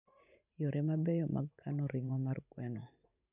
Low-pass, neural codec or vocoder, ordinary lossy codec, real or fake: 3.6 kHz; vocoder, 44.1 kHz, 128 mel bands every 512 samples, BigVGAN v2; none; fake